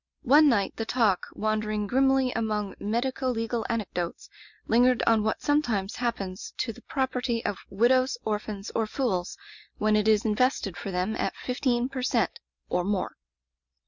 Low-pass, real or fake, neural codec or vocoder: 7.2 kHz; real; none